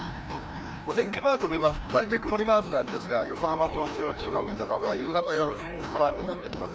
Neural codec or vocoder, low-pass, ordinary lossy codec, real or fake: codec, 16 kHz, 1 kbps, FreqCodec, larger model; none; none; fake